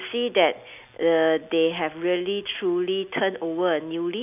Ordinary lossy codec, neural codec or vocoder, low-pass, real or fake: none; none; 3.6 kHz; real